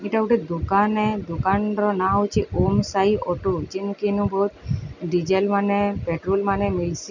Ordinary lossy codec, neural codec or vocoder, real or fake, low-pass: none; none; real; 7.2 kHz